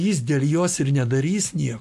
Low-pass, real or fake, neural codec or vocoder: 14.4 kHz; real; none